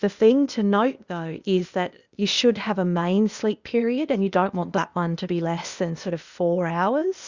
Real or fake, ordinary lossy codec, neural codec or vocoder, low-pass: fake; Opus, 64 kbps; codec, 16 kHz, 0.8 kbps, ZipCodec; 7.2 kHz